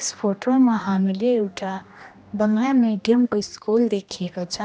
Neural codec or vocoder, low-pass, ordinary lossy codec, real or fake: codec, 16 kHz, 1 kbps, X-Codec, HuBERT features, trained on general audio; none; none; fake